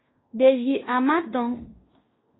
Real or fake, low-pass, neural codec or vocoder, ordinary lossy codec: fake; 7.2 kHz; codec, 16 kHz in and 24 kHz out, 0.9 kbps, LongCat-Audio-Codec, fine tuned four codebook decoder; AAC, 16 kbps